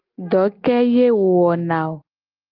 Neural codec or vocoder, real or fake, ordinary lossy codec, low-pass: none; real; Opus, 24 kbps; 5.4 kHz